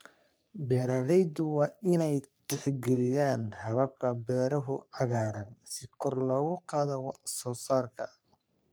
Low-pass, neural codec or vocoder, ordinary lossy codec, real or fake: none; codec, 44.1 kHz, 3.4 kbps, Pupu-Codec; none; fake